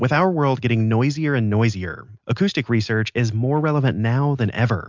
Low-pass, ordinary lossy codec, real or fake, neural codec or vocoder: 7.2 kHz; MP3, 64 kbps; real; none